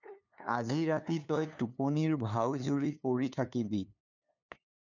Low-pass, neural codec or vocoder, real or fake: 7.2 kHz; codec, 16 kHz, 2 kbps, FunCodec, trained on LibriTTS, 25 frames a second; fake